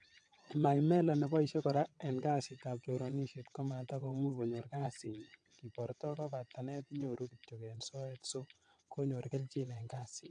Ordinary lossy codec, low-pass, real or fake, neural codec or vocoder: AAC, 64 kbps; 9.9 kHz; fake; vocoder, 22.05 kHz, 80 mel bands, WaveNeXt